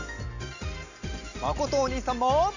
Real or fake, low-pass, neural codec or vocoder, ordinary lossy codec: real; 7.2 kHz; none; none